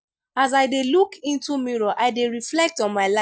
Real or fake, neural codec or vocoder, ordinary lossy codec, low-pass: real; none; none; none